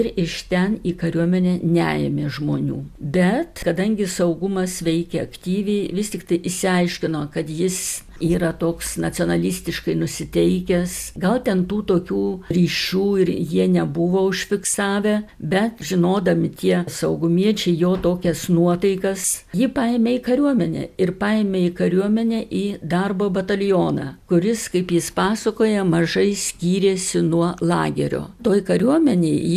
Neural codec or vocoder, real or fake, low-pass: none; real; 14.4 kHz